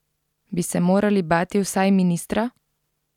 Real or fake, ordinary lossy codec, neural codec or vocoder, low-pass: real; none; none; 19.8 kHz